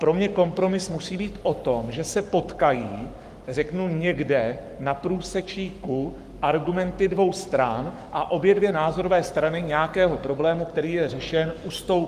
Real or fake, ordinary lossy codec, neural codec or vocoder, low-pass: fake; Opus, 32 kbps; codec, 44.1 kHz, 7.8 kbps, Pupu-Codec; 14.4 kHz